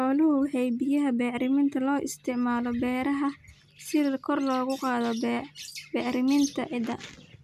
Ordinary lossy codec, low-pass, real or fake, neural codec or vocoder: none; 14.4 kHz; real; none